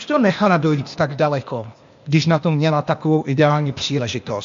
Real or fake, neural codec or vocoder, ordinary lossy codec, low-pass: fake; codec, 16 kHz, 0.8 kbps, ZipCodec; MP3, 48 kbps; 7.2 kHz